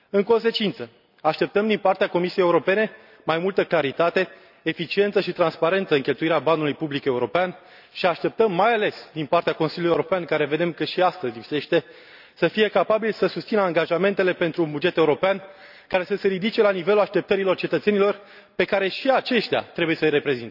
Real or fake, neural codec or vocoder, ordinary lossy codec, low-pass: real; none; none; 5.4 kHz